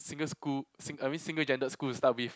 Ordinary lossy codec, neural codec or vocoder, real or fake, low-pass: none; none; real; none